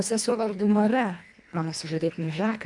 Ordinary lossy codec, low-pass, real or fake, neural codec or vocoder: MP3, 96 kbps; 10.8 kHz; fake; codec, 24 kHz, 1.5 kbps, HILCodec